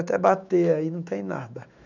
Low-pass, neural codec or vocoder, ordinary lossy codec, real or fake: 7.2 kHz; autoencoder, 48 kHz, 128 numbers a frame, DAC-VAE, trained on Japanese speech; none; fake